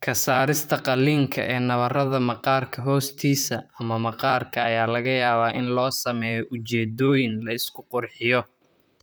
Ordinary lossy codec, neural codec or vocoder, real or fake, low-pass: none; vocoder, 44.1 kHz, 128 mel bands, Pupu-Vocoder; fake; none